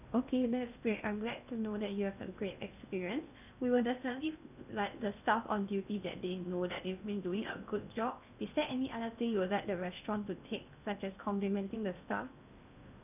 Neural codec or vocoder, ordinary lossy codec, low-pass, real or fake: codec, 16 kHz in and 24 kHz out, 0.8 kbps, FocalCodec, streaming, 65536 codes; none; 3.6 kHz; fake